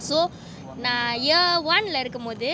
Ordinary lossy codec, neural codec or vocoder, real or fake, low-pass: none; none; real; none